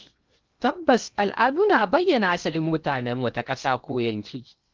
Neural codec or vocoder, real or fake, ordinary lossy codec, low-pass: codec, 16 kHz in and 24 kHz out, 0.8 kbps, FocalCodec, streaming, 65536 codes; fake; Opus, 24 kbps; 7.2 kHz